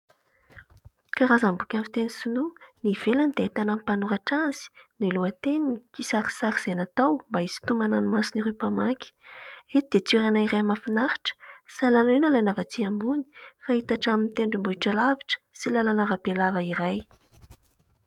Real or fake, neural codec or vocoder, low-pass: fake; codec, 44.1 kHz, 7.8 kbps, DAC; 19.8 kHz